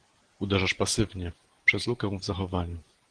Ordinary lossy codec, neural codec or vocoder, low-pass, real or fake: Opus, 16 kbps; none; 9.9 kHz; real